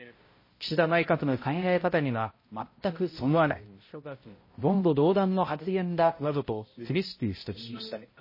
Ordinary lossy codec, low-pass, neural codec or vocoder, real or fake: MP3, 24 kbps; 5.4 kHz; codec, 16 kHz, 0.5 kbps, X-Codec, HuBERT features, trained on balanced general audio; fake